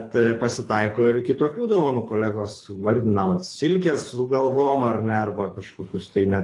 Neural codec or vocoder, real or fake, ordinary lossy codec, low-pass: codec, 24 kHz, 3 kbps, HILCodec; fake; AAC, 48 kbps; 10.8 kHz